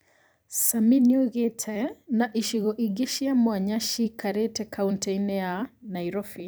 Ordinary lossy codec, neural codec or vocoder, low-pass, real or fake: none; vocoder, 44.1 kHz, 128 mel bands every 256 samples, BigVGAN v2; none; fake